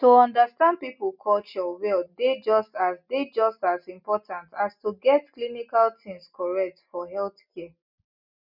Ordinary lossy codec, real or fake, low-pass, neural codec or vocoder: none; real; 5.4 kHz; none